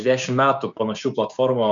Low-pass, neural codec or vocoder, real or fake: 7.2 kHz; codec, 16 kHz, 6 kbps, DAC; fake